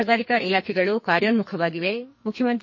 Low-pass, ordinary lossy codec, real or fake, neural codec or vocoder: 7.2 kHz; MP3, 32 kbps; fake; codec, 44.1 kHz, 2.6 kbps, SNAC